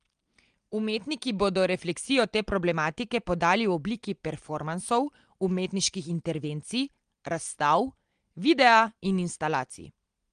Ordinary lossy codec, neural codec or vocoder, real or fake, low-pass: Opus, 24 kbps; none; real; 9.9 kHz